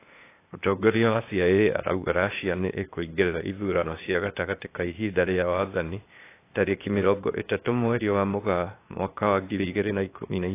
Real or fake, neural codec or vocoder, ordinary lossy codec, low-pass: fake; codec, 16 kHz, 0.8 kbps, ZipCodec; AAC, 24 kbps; 3.6 kHz